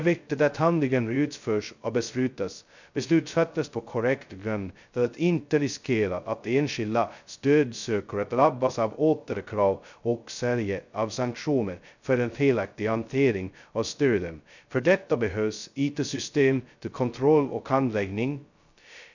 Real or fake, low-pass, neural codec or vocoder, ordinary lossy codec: fake; 7.2 kHz; codec, 16 kHz, 0.2 kbps, FocalCodec; none